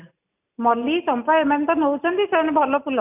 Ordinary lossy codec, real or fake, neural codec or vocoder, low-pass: none; real; none; 3.6 kHz